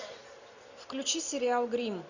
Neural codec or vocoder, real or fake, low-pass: none; real; 7.2 kHz